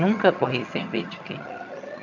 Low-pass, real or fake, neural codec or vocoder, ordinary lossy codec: 7.2 kHz; fake; vocoder, 22.05 kHz, 80 mel bands, HiFi-GAN; none